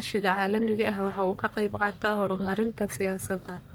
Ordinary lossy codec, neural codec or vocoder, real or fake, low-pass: none; codec, 44.1 kHz, 1.7 kbps, Pupu-Codec; fake; none